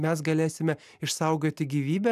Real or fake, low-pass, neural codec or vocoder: real; 14.4 kHz; none